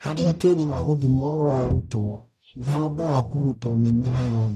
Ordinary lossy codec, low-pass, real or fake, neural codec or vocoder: none; 14.4 kHz; fake; codec, 44.1 kHz, 0.9 kbps, DAC